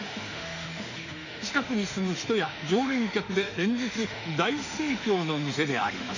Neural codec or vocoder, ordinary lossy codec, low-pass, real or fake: autoencoder, 48 kHz, 32 numbers a frame, DAC-VAE, trained on Japanese speech; none; 7.2 kHz; fake